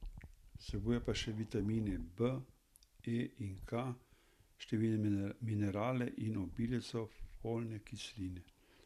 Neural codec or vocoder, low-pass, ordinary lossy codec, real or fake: none; 14.4 kHz; none; real